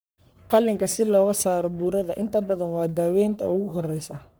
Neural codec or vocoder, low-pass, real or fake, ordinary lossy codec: codec, 44.1 kHz, 3.4 kbps, Pupu-Codec; none; fake; none